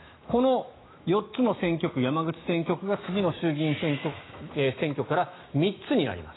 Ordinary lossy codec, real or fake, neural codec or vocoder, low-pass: AAC, 16 kbps; fake; autoencoder, 48 kHz, 128 numbers a frame, DAC-VAE, trained on Japanese speech; 7.2 kHz